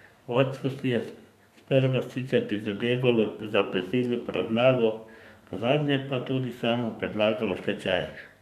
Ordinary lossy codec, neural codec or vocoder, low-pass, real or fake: none; codec, 32 kHz, 1.9 kbps, SNAC; 14.4 kHz; fake